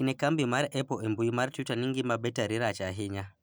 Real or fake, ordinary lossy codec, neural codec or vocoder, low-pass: real; none; none; none